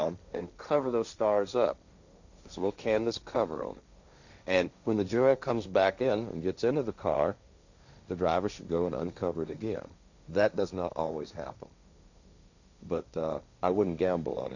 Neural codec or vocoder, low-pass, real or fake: codec, 16 kHz, 1.1 kbps, Voila-Tokenizer; 7.2 kHz; fake